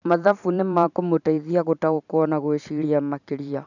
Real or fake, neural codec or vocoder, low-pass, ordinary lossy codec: fake; vocoder, 44.1 kHz, 128 mel bands every 512 samples, BigVGAN v2; 7.2 kHz; none